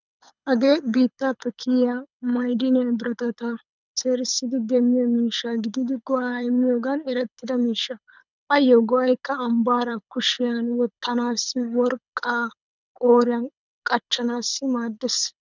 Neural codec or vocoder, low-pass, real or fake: codec, 24 kHz, 6 kbps, HILCodec; 7.2 kHz; fake